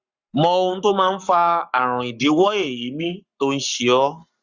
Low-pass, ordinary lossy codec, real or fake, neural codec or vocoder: 7.2 kHz; Opus, 64 kbps; fake; codec, 44.1 kHz, 7.8 kbps, Pupu-Codec